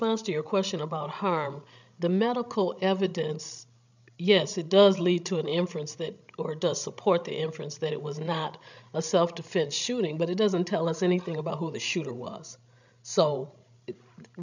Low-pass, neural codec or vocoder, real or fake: 7.2 kHz; codec, 16 kHz, 16 kbps, FreqCodec, larger model; fake